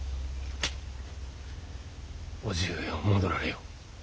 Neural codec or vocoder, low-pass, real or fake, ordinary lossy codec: none; none; real; none